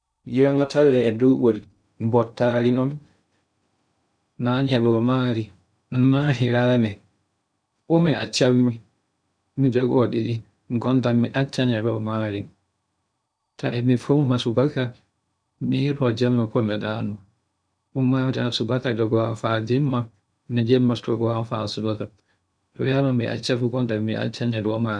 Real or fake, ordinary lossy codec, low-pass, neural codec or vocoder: fake; none; 9.9 kHz; codec, 16 kHz in and 24 kHz out, 0.6 kbps, FocalCodec, streaming, 2048 codes